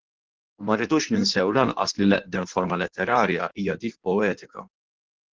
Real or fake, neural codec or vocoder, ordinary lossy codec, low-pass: fake; codec, 16 kHz in and 24 kHz out, 1.1 kbps, FireRedTTS-2 codec; Opus, 16 kbps; 7.2 kHz